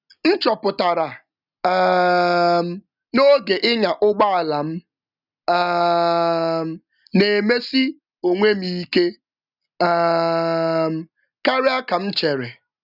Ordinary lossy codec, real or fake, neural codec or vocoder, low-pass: none; real; none; 5.4 kHz